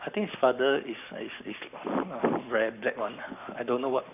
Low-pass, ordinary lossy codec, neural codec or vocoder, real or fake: 3.6 kHz; none; codec, 44.1 kHz, 7.8 kbps, Pupu-Codec; fake